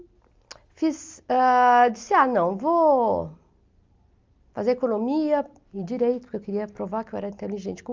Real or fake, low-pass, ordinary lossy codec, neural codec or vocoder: real; 7.2 kHz; Opus, 32 kbps; none